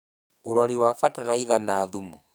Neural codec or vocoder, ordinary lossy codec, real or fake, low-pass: codec, 44.1 kHz, 2.6 kbps, SNAC; none; fake; none